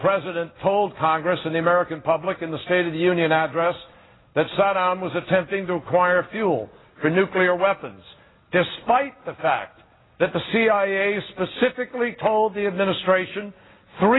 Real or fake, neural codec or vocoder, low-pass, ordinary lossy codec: real; none; 7.2 kHz; AAC, 16 kbps